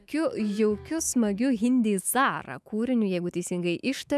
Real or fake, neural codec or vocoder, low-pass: fake; autoencoder, 48 kHz, 128 numbers a frame, DAC-VAE, trained on Japanese speech; 14.4 kHz